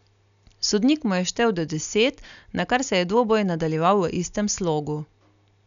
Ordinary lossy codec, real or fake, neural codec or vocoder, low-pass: none; real; none; 7.2 kHz